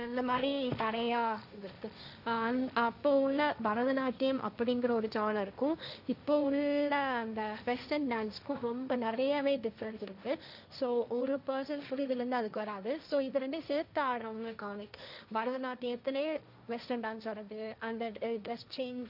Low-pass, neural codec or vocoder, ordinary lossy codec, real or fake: 5.4 kHz; codec, 16 kHz, 1.1 kbps, Voila-Tokenizer; none; fake